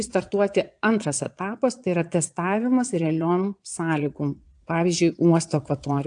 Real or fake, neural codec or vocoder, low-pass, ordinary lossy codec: fake; vocoder, 22.05 kHz, 80 mel bands, Vocos; 9.9 kHz; AAC, 64 kbps